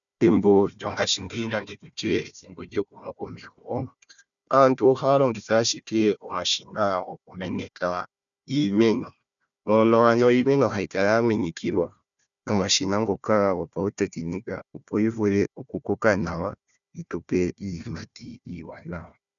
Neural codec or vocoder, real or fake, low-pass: codec, 16 kHz, 1 kbps, FunCodec, trained on Chinese and English, 50 frames a second; fake; 7.2 kHz